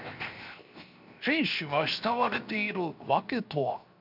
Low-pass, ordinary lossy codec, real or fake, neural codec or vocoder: 5.4 kHz; MP3, 48 kbps; fake; codec, 16 kHz, 0.7 kbps, FocalCodec